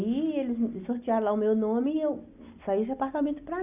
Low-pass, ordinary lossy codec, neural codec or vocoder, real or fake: 3.6 kHz; none; none; real